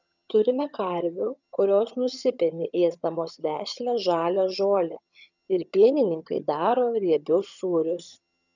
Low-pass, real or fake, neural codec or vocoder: 7.2 kHz; fake; vocoder, 22.05 kHz, 80 mel bands, HiFi-GAN